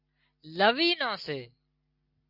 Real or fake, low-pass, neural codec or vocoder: real; 5.4 kHz; none